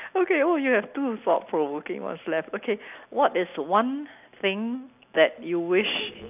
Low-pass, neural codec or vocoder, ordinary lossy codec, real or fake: 3.6 kHz; none; none; real